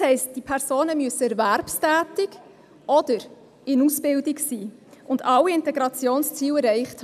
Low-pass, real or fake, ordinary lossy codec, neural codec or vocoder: 14.4 kHz; real; none; none